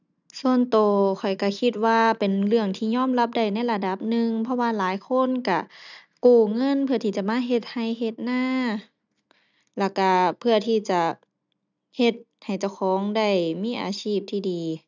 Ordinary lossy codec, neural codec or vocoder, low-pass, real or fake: none; none; 7.2 kHz; real